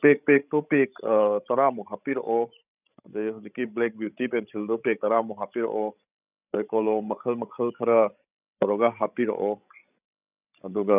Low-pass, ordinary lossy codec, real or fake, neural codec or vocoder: 3.6 kHz; none; fake; codec, 16 kHz, 16 kbps, FreqCodec, larger model